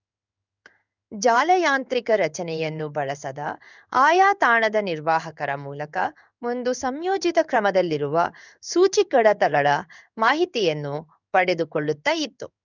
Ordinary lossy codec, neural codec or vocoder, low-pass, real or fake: none; codec, 16 kHz in and 24 kHz out, 1 kbps, XY-Tokenizer; 7.2 kHz; fake